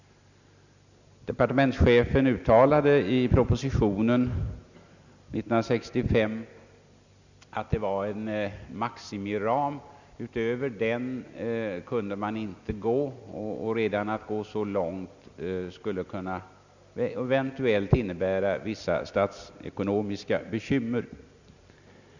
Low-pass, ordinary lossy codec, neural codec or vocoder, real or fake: 7.2 kHz; none; none; real